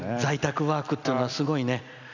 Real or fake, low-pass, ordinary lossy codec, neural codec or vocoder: real; 7.2 kHz; none; none